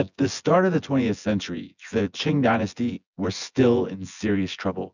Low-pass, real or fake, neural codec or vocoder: 7.2 kHz; fake; vocoder, 24 kHz, 100 mel bands, Vocos